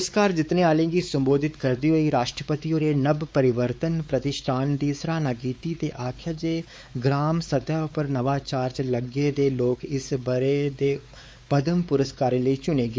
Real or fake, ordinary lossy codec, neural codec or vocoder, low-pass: fake; none; codec, 16 kHz, 4 kbps, X-Codec, WavLM features, trained on Multilingual LibriSpeech; none